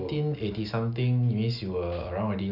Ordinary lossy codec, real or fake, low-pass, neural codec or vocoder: none; real; 5.4 kHz; none